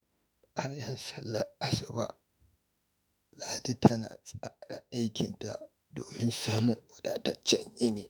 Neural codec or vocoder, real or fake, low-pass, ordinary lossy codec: autoencoder, 48 kHz, 32 numbers a frame, DAC-VAE, trained on Japanese speech; fake; none; none